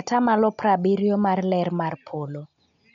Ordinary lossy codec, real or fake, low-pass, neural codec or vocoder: MP3, 96 kbps; real; 7.2 kHz; none